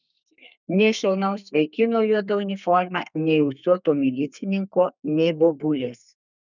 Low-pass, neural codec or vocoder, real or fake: 7.2 kHz; codec, 32 kHz, 1.9 kbps, SNAC; fake